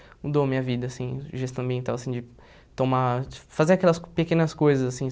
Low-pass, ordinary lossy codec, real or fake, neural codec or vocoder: none; none; real; none